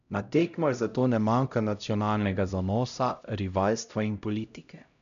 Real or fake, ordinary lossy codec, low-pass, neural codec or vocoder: fake; none; 7.2 kHz; codec, 16 kHz, 0.5 kbps, X-Codec, HuBERT features, trained on LibriSpeech